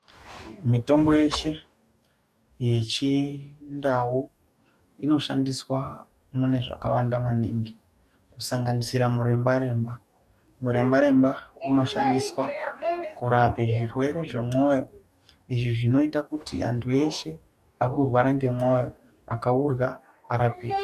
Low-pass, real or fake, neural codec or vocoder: 14.4 kHz; fake; codec, 44.1 kHz, 2.6 kbps, DAC